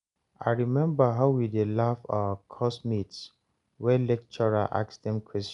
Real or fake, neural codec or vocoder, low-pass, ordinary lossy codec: real; none; 10.8 kHz; none